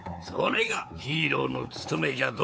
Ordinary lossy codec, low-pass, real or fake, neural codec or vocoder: none; none; fake; codec, 16 kHz, 4 kbps, X-Codec, WavLM features, trained on Multilingual LibriSpeech